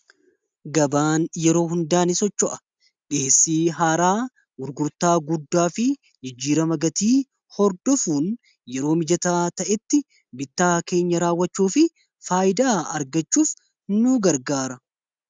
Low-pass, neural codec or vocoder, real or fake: 9.9 kHz; none; real